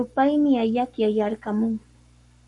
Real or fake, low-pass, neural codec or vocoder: fake; 10.8 kHz; codec, 44.1 kHz, 7.8 kbps, Pupu-Codec